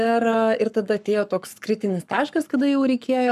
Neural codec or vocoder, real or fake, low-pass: codec, 44.1 kHz, 7.8 kbps, Pupu-Codec; fake; 14.4 kHz